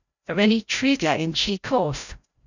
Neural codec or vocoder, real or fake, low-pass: codec, 16 kHz, 0.5 kbps, FreqCodec, larger model; fake; 7.2 kHz